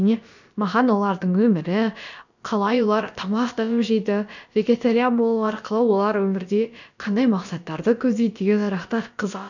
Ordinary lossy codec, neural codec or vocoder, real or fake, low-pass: none; codec, 16 kHz, about 1 kbps, DyCAST, with the encoder's durations; fake; 7.2 kHz